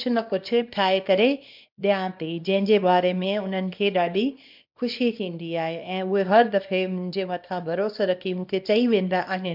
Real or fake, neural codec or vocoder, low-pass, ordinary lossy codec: fake; codec, 24 kHz, 0.9 kbps, WavTokenizer, small release; 5.4 kHz; MP3, 48 kbps